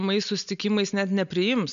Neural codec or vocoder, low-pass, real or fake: none; 7.2 kHz; real